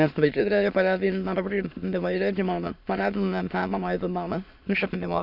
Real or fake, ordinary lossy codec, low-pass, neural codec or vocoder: fake; none; 5.4 kHz; autoencoder, 22.05 kHz, a latent of 192 numbers a frame, VITS, trained on many speakers